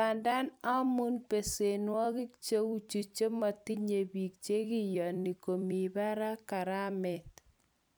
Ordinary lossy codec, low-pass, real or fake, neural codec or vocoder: none; none; fake; vocoder, 44.1 kHz, 128 mel bands every 256 samples, BigVGAN v2